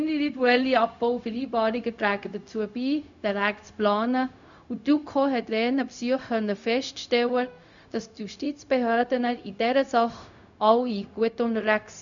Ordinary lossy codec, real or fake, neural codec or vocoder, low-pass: none; fake; codec, 16 kHz, 0.4 kbps, LongCat-Audio-Codec; 7.2 kHz